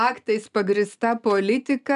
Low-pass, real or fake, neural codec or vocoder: 10.8 kHz; real; none